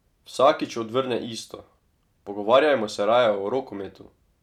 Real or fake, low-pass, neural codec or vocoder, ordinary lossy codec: real; 19.8 kHz; none; none